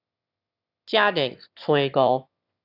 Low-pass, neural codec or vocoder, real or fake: 5.4 kHz; autoencoder, 22.05 kHz, a latent of 192 numbers a frame, VITS, trained on one speaker; fake